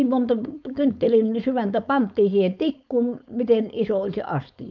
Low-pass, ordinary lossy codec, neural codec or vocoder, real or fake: 7.2 kHz; none; codec, 16 kHz, 4.8 kbps, FACodec; fake